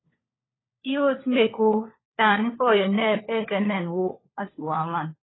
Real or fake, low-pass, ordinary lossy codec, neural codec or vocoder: fake; 7.2 kHz; AAC, 16 kbps; codec, 16 kHz, 4 kbps, FunCodec, trained on LibriTTS, 50 frames a second